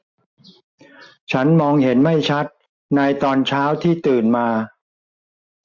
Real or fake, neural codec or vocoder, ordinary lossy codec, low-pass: real; none; MP3, 48 kbps; 7.2 kHz